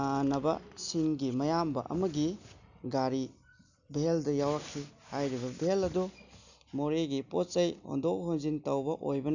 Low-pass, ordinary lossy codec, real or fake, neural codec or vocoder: 7.2 kHz; none; real; none